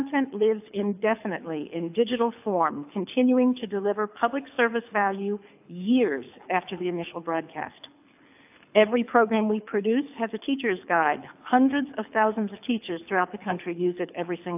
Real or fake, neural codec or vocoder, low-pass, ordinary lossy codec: fake; codec, 24 kHz, 6 kbps, HILCodec; 3.6 kHz; AAC, 32 kbps